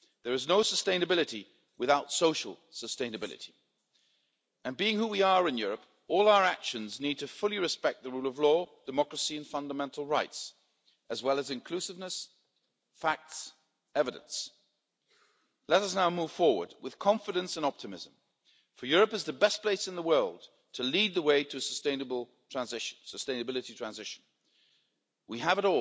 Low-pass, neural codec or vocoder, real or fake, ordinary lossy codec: none; none; real; none